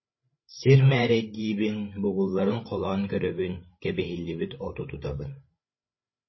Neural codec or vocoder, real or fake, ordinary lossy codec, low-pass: codec, 16 kHz, 8 kbps, FreqCodec, larger model; fake; MP3, 24 kbps; 7.2 kHz